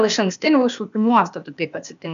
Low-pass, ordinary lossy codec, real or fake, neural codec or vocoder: 7.2 kHz; AAC, 96 kbps; fake; codec, 16 kHz, 0.8 kbps, ZipCodec